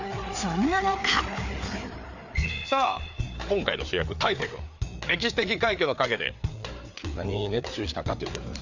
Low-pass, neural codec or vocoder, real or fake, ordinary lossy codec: 7.2 kHz; codec, 16 kHz, 4 kbps, FreqCodec, larger model; fake; none